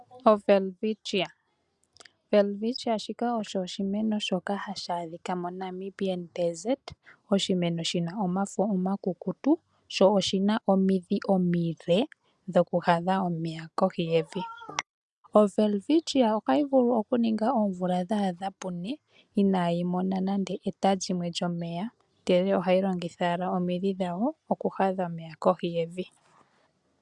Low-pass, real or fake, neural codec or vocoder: 10.8 kHz; real; none